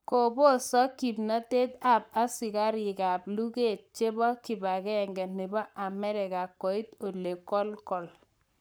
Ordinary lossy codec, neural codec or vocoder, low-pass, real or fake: none; codec, 44.1 kHz, 7.8 kbps, Pupu-Codec; none; fake